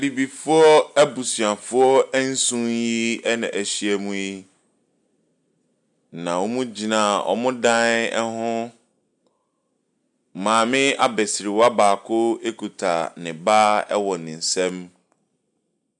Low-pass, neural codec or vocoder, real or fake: 10.8 kHz; none; real